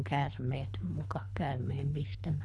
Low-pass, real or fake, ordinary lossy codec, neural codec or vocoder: none; fake; none; codec, 24 kHz, 3 kbps, HILCodec